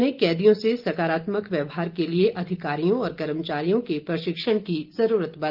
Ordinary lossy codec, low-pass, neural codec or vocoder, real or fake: Opus, 32 kbps; 5.4 kHz; none; real